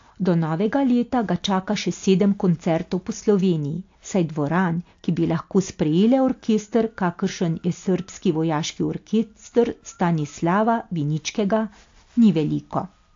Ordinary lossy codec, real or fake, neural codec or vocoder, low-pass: AAC, 48 kbps; real; none; 7.2 kHz